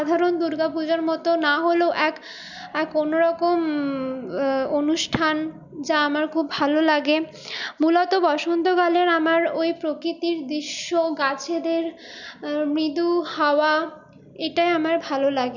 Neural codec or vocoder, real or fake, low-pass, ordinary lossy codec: none; real; 7.2 kHz; none